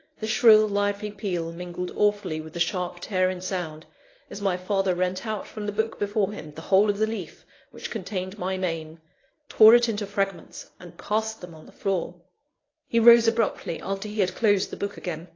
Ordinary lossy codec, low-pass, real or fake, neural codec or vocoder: AAC, 32 kbps; 7.2 kHz; fake; codec, 24 kHz, 0.9 kbps, WavTokenizer, medium speech release version 1